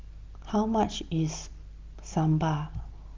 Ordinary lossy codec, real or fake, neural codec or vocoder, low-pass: Opus, 24 kbps; real; none; 7.2 kHz